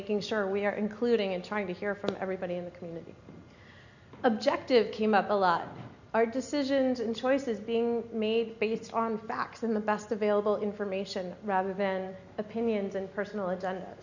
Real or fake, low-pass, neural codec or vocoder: real; 7.2 kHz; none